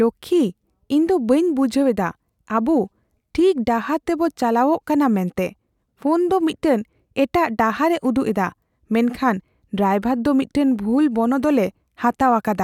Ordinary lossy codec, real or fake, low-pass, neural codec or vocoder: none; real; 19.8 kHz; none